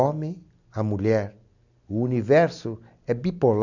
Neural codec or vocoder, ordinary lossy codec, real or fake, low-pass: none; none; real; 7.2 kHz